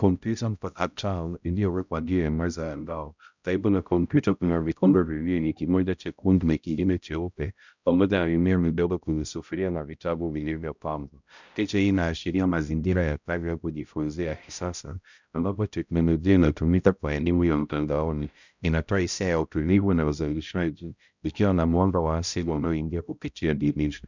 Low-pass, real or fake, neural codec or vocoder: 7.2 kHz; fake; codec, 16 kHz, 0.5 kbps, X-Codec, HuBERT features, trained on balanced general audio